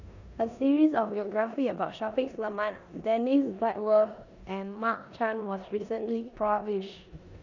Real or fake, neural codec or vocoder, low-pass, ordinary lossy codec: fake; codec, 16 kHz in and 24 kHz out, 0.9 kbps, LongCat-Audio-Codec, four codebook decoder; 7.2 kHz; none